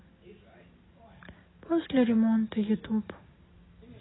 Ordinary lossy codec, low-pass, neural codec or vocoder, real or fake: AAC, 16 kbps; 7.2 kHz; none; real